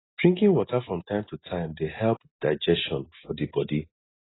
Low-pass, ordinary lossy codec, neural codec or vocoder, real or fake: 7.2 kHz; AAC, 16 kbps; none; real